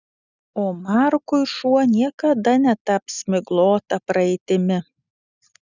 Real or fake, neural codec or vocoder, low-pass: real; none; 7.2 kHz